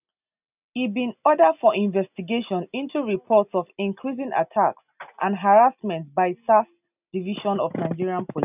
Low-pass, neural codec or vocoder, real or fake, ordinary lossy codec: 3.6 kHz; none; real; none